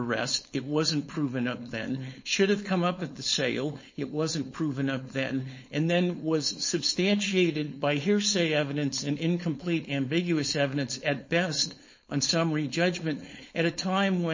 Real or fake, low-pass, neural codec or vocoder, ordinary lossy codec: fake; 7.2 kHz; codec, 16 kHz, 4.8 kbps, FACodec; MP3, 32 kbps